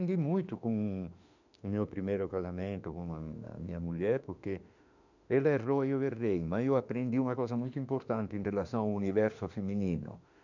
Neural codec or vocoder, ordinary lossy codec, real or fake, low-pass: autoencoder, 48 kHz, 32 numbers a frame, DAC-VAE, trained on Japanese speech; none; fake; 7.2 kHz